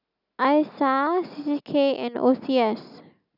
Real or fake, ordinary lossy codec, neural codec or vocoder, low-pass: real; none; none; 5.4 kHz